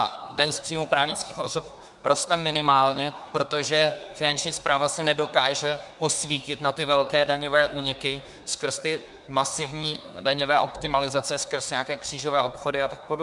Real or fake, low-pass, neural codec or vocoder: fake; 10.8 kHz; codec, 24 kHz, 1 kbps, SNAC